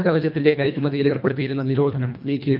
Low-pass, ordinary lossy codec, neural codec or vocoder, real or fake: 5.4 kHz; none; codec, 24 kHz, 1.5 kbps, HILCodec; fake